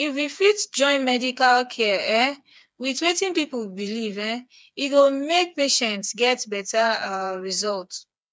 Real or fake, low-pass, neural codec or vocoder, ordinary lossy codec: fake; none; codec, 16 kHz, 4 kbps, FreqCodec, smaller model; none